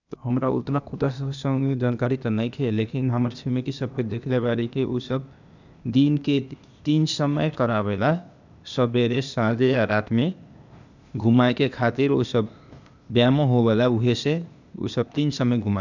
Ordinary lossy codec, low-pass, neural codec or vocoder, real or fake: none; 7.2 kHz; codec, 16 kHz, 0.8 kbps, ZipCodec; fake